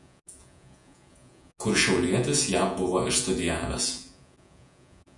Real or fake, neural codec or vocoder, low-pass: fake; vocoder, 48 kHz, 128 mel bands, Vocos; 10.8 kHz